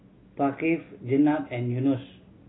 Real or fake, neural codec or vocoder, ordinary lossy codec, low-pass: real; none; AAC, 16 kbps; 7.2 kHz